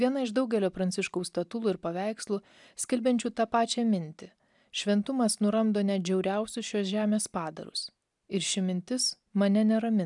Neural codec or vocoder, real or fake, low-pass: none; real; 10.8 kHz